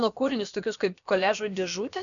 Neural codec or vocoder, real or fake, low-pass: codec, 16 kHz, about 1 kbps, DyCAST, with the encoder's durations; fake; 7.2 kHz